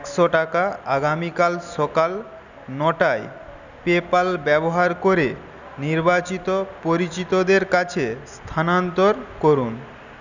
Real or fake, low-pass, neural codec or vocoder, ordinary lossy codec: real; 7.2 kHz; none; none